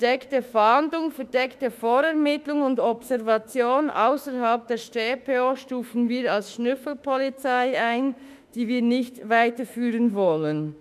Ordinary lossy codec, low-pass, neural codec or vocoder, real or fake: none; 14.4 kHz; autoencoder, 48 kHz, 32 numbers a frame, DAC-VAE, trained on Japanese speech; fake